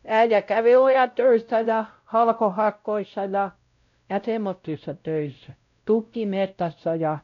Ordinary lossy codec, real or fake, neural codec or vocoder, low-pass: none; fake; codec, 16 kHz, 0.5 kbps, X-Codec, WavLM features, trained on Multilingual LibriSpeech; 7.2 kHz